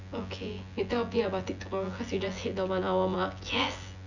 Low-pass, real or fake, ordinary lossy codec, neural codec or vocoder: 7.2 kHz; fake; none; vocoder, 24 kHz, 100 mel bands, Vocos